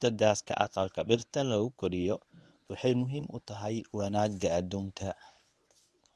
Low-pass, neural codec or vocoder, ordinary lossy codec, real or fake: none; codec, 24 kHz, 0.9 kbps, WavTokenizer, medium speech release version 1; none; fake